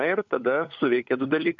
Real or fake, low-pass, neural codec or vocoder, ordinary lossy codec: fake; 7.2 kHz; codec, 16 kHz, 16 kbps, FunCodec, trained on LibriTTS, 50 frames a second; MP3, 48 kbps